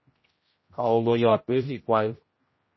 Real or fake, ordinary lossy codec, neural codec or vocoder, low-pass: fake; MP3, 24 kbps; codec, 16 kHz, 0.5 kbps, X-Codec, HuBERT features, trained on general audio; 7.2 kHz